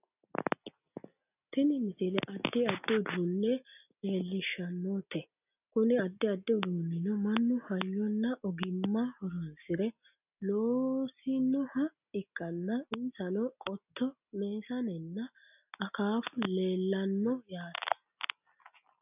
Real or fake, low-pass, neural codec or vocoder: real; 3.6 kHz; none